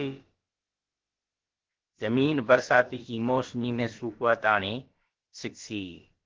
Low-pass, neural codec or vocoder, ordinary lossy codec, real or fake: 7.2 kHz; codec, 16 kHz, about 1 kbps, DyCAST, with the encoder's durations; Opus, 16 kbps; fake